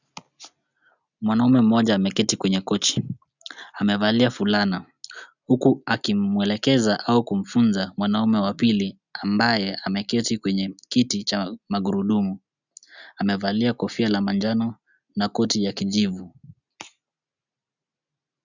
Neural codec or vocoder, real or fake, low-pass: none; real; 7.2 kHz